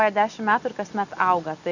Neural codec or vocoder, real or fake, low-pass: none; real; 7.2 kHz